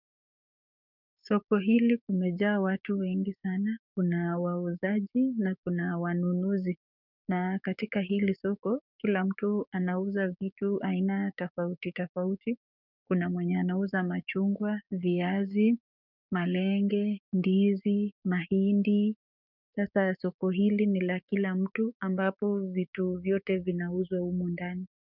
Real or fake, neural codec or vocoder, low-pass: fake; codec, 16 kHz, 6 kbps, DAC; 5.4 kHz